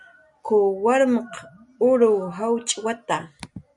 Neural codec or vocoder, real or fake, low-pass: none; real; 10.8 kHz